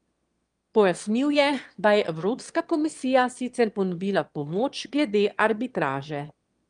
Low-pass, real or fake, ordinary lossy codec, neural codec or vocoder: 9.9 kHz; fake; Opus, 32 kbps; autoencoder, 22.05 kHz, a latent of 192 numbers a frame, VITS, trained on one speaker